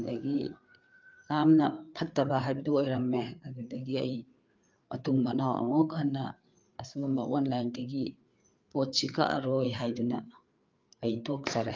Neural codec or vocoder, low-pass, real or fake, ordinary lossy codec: codec, 16 kHz, 4 kbps, FreqCodec, larger model; 7.2 kHz; fake; Opus, 24 kbps